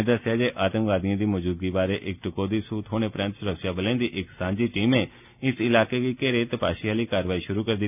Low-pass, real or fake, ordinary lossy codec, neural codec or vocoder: 3.6 kHz; real; none; none